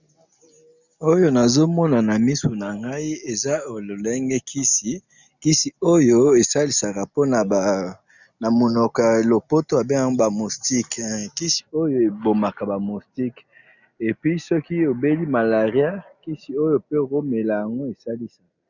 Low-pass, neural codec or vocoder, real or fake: 7.2 kHz; none; real